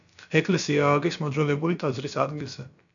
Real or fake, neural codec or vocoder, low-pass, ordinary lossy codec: fake; codec, 16 kHz, about 1 kbps, DyCAST, with the encoder's durations; 7.2 kHz; MP3, 96 kbps